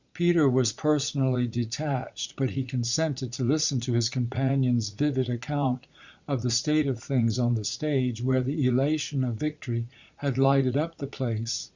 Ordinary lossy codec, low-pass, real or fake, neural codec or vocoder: Opus, 64 kbps; 7.2 kHz; fake; vocoder, 44.1 kHz, 80 mel bands, Vocos